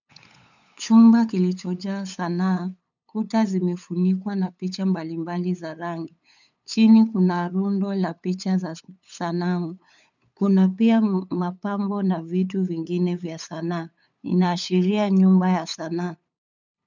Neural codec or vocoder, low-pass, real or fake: codec, 16 kHz, 8 kbps, FunCodec, trained on LibriTTS, 25 frames a second; 7.2 kHz; fake